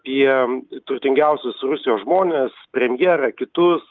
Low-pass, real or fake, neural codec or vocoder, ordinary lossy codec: 7.2 kHz; real; none; Opus, 32 kbps